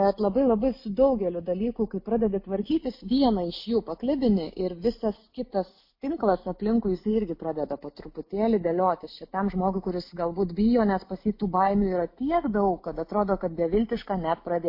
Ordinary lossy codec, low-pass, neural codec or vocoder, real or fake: MP3, 32 kbps; 5.4 kHz; none; real